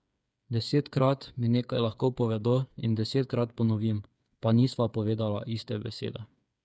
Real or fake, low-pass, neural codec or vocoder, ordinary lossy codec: fake; none; codec, 16 kHz, 8 kbps, FreqCodec, smaller model; none